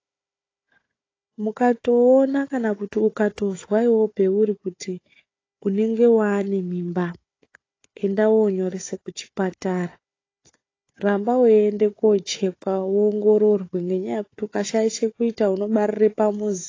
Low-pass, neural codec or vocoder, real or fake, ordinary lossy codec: 7.2 kHz; codec, 16 kHz, 4 kbps, FunCodec, trained on Chinese and English, 50 frames a second; fake; AAC, 32 kbps